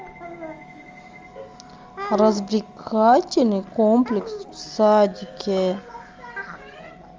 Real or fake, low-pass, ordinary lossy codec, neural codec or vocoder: real; 7.2 kHz; Opus, 32 kbps; none